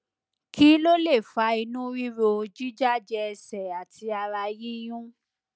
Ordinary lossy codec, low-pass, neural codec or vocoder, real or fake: none; none; none; real